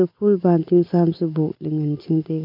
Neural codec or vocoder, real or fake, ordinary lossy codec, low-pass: none; real; none; 5.4 kHz